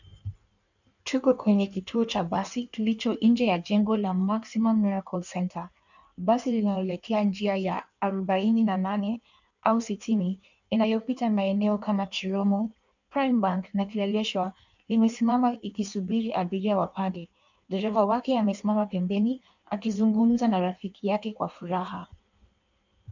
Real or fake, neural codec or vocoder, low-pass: fake; codec, 16 kHz in and 24 kHz out, 1.1 kbps, FireRedTTS-2 codec; 7.2 kHz